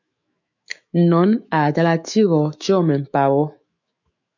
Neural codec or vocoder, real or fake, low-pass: autoencoder, 48 kHz, 128 numbers a frame, DAC-VAE, trained on Japanese speech; fake; 7.2 kHz